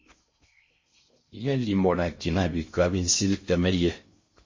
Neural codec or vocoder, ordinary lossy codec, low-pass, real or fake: codec, 16 kHz in and 24 kHz out, 0.6 kbps, FocalCodec, streaming, 4096 codes; MP3, 32 kbps; 7.2 kHz; fake